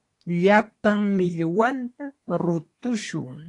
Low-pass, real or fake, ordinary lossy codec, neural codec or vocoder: 10.8 kHz; fake; AAC, 48 kbps; codec, 24 kHz, 1 kbps, SNAC